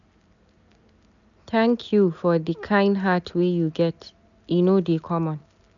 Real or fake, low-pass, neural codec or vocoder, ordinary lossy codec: real; 7.2 kHz; none; none